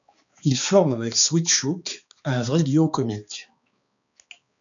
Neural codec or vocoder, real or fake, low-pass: codec, 16 kHz, 2 kbps, X-Codec, HuBERT features, trained on balanced general audio; fake; 7.2 kHz